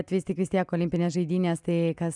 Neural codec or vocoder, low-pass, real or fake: none; 10.8 kHz; real